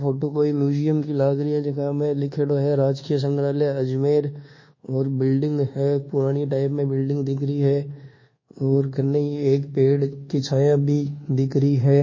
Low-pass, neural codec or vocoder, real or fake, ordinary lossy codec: 7.2 kHz; codec, 24 kHz, 1.2 kbps, DualCodec; fake; MP3, 32 kbps